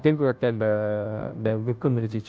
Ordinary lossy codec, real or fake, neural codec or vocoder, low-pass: none; fake; codec, 16 kHz, 0.5 kbps, FunCodec, trained on Chinese and English, 25 frames a second; none